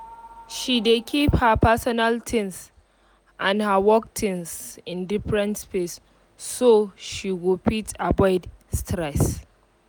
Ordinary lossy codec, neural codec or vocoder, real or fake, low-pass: none; none; real; none